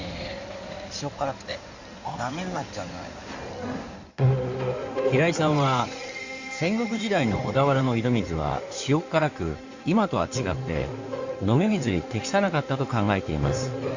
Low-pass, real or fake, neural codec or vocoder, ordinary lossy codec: 7.2 kHz; fake; codec, 16 kHz in and 24 kHz out, 2.2 kbps, FireRedTTS-2 codec; Opus, 64 kbps